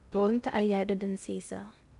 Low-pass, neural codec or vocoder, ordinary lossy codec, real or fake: 10.8 kHz; codec, 16 kHz in and 24 kHz out, 0.6 kbps, FocalCodec, streaming, 2048 codes; none; fake